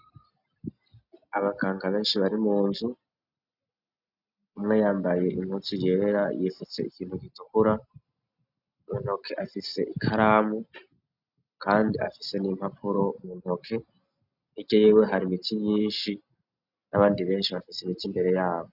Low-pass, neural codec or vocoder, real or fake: 5.4 kHz; none; real